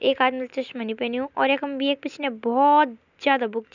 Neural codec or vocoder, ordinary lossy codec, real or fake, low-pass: none; none; real; 7.2 kHz